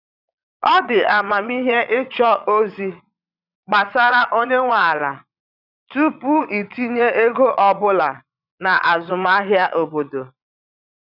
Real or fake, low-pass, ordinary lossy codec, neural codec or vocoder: fake; 5.4 kHz; none; vocoder, 22.05 kHz, 80 mel bands, Vocos